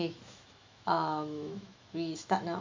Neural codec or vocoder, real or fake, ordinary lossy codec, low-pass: autoencoder, 48 kHz, 128 numbers a frame, DAC-VAE, trained on Japanese speech; fake; MP3, 64 kbps; 7.2 kHz